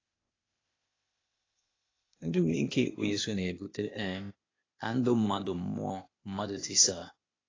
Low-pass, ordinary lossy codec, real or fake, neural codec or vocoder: 7.2 kHz; AAC, 32 kbps; fake; codec, 16 kHz, 0.8 kbps, ZipCodec